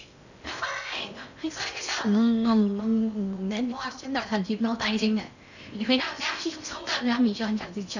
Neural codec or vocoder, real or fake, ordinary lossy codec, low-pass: codec, 16 kHz in and 24 kHz out, 0.6 kbps, FocalCodec, streaming, 4096 codes; fake; none; 7.2 kHz